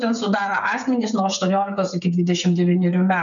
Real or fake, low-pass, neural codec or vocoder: fake; 7.2 kHz; codec, 16 kHz, 8 kbps, FreqCodec, smaller model